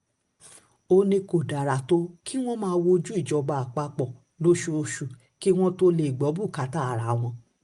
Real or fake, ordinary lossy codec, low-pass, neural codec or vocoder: real; Opus, 32 kbps; 10.8 kHz; none